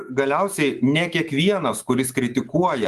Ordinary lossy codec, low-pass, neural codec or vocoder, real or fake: Opus, 32 kbps; 14.4 kHz; autoencoder, 48 kHz, 128 numbers a frame, DAC-VAE, trained on Japanese speech; fake